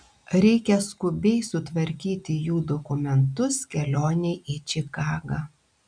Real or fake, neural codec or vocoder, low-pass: real; none; 9.9 kHz